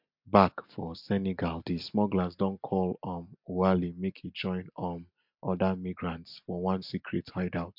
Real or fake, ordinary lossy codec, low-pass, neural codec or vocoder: real; MP3, 48 kbps; 5.4 kHz; none